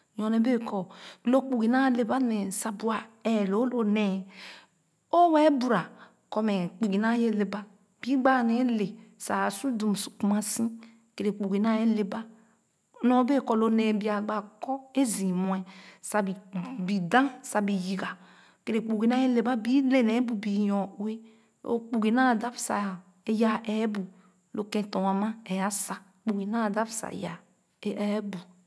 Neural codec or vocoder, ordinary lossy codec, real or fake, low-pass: none; none; real; none